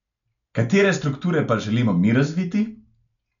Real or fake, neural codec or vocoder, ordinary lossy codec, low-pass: real; none; none; 7.2 kHz